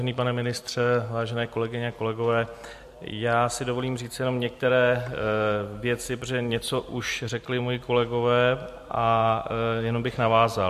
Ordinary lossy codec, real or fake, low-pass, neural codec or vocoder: MP3, 64 kbps; real; 14.4 kHz; none